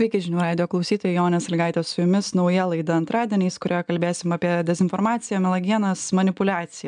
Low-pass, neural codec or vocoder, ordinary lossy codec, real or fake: 9.9 kHz; none; MP3, 96 kbps; real